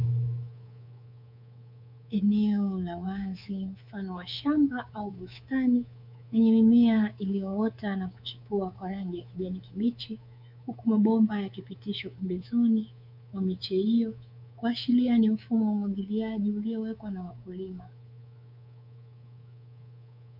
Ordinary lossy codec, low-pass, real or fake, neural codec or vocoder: MP3, 48 kbps; 5.4 kHz; fake; codec, 24 kHz, 3.1 kbps, DualCodec